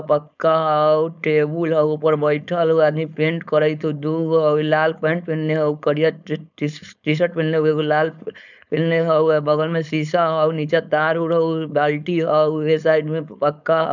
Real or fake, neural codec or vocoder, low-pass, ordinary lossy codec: fake; codec, 16 kHz, 4.8 kbps, FACodec; 7.2 kHz; none